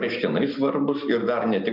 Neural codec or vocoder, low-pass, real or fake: none; 5.4 kHz; real